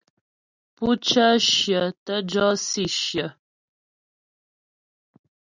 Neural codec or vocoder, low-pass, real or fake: none; 7.2 kHz; real